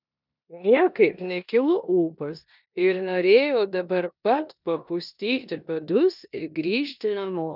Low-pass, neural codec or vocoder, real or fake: 5.4 kHz; codec, 16 kHz in and 24 kHz out, 0.9 kbps, LongCat-Audio-Codec, four codebook decoder; fake